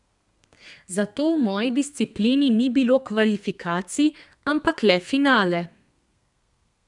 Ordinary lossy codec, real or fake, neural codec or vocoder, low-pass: none; fake; codec, 32 kHz, 1.9 kbps, SNAC; 10.8 kHz